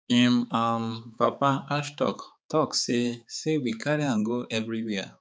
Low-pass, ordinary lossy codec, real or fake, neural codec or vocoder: none; none; fake; codec, 16 kHz, 4 kbps, X-Codec, HuBERT features, trained on balanced general audio